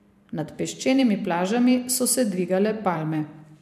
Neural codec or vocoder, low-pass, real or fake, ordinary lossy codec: vocoder, 44.1 kHz, 128 mel bands every 512 samples, BigVGAN v2; 14.4 kHz; fake; MP3, 96 kbps